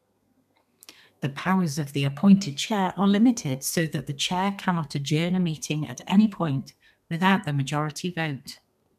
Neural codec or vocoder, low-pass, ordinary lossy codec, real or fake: codec, 32 kHz, 1.9 kbps, SNAC; 14.4 kHz; none; fake